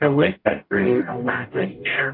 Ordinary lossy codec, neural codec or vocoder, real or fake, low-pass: AAC, 32 kbps; codec, 44.1 kHz, 0.9 kbps, DAC; fake; 5.4 kHz